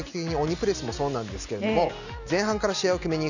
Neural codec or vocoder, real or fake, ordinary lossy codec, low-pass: none; real; none; 7.2 kHz